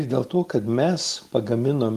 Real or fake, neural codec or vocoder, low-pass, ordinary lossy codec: real; none; 14.4 kHz; Opus, 24 kbps